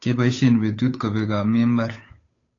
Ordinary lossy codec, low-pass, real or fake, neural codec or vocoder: AAC, 32 kbps; 7.2 kHz; fake; codec, 16 kHz, 8 kbps, FunCodec, trained on Chinese and English, 25 frames a second